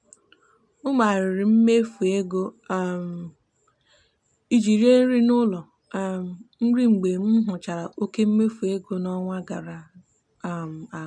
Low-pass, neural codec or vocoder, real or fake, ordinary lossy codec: 9.9 kHz; none; real; none